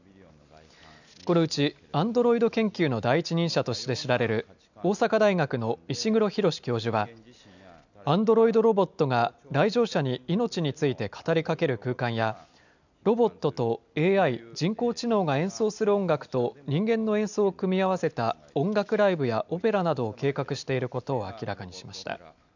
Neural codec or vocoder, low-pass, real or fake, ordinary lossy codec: none; 7.2 kHz; real; none